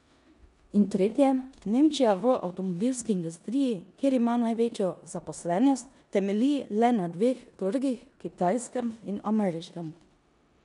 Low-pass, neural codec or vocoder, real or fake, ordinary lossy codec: 10.8 kHz; codec, 16 kHz in and 24 kHz out, 0.9 kbps, LongCat-Audio-Codec, four codebook decoder; fake; none